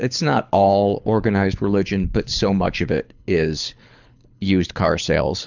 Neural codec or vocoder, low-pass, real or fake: codec, 24 kHz, 6 kbps, HILCodec; 7.2 kHz; fake